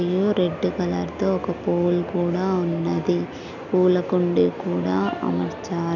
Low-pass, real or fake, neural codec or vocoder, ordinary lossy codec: 7.2 kHz; real; none; none